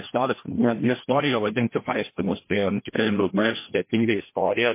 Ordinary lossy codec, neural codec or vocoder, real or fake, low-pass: MP3, 24 kbps; codec, 16 kHz, 1 kbps, FreqCodec, larger model; fake; 3.6 kHz